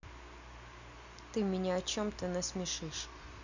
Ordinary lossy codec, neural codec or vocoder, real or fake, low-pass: none; none; real; 7.2 kHz